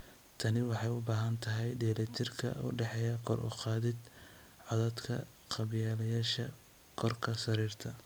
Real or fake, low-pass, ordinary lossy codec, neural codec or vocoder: fake; none; none; vocoder, 44.1 kHz, 128 mel bands every 256 samples, BigVGAN v2